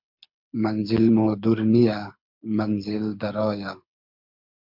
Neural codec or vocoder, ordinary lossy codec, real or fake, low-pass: codec, 24 kHz, 6 kbps, HILCodec; MP3, 48 kbps; fake; 5.4 kHz